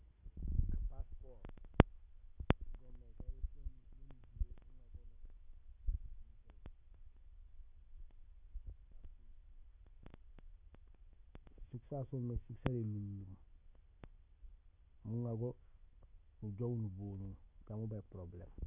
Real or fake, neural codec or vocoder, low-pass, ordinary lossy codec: real; none; 3.6 kHz; none